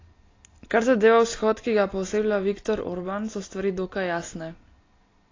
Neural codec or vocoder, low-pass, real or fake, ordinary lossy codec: none; 7.2 kHz; real; AAC, 32 kbps